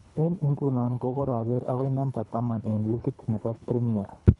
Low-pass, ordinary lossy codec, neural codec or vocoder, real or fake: 10.8 kHz; none; codec, 24 kHz, 3 kbps, HILCodec; fake